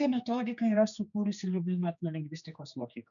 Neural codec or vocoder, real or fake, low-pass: codec, 16 kHz, 2 kbps, X-Codec, HuBERT features, trained on general audio; fake; 7.2 kHz